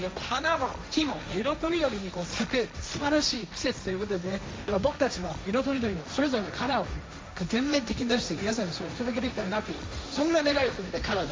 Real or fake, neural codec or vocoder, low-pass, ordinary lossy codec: fake; codec, 16 kHz, 1.1 kbps, Voila-Tokenizer; none; none